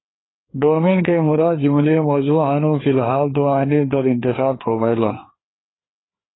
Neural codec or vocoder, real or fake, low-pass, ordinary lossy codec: codec, 16 kHz, 2 kbps, FreqCodec, larger model; fake; 7.2 kHz; AAC, 16 kbps